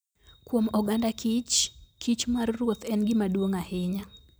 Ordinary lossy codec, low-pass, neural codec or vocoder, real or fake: none; none; none; real